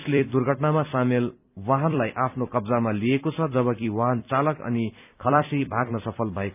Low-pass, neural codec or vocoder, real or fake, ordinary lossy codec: 3.6 kHz; vocoder, 44.1 kHz, 128 mel bands every 256 samples, BigVGAN v2; fake; none